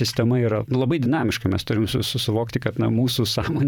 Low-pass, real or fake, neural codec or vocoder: 19.8 kHz; fake; vocoder, 44.1 kHz, 128 mel bands, Pupu-Vocoder